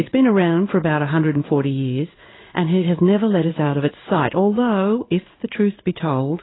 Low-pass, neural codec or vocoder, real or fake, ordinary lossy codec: 7.2 kHz; codec, 16 kHz in and 24 kHz out, 1 kbps, XY-Tokenizer; fake; AAC, 16 kbps